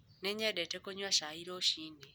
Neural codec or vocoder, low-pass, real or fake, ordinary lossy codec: none; none; real; none